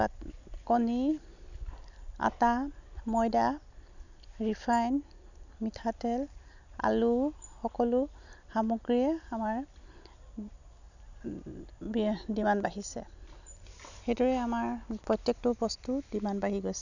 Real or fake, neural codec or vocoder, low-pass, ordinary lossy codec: real; none; 7.2 kHz; none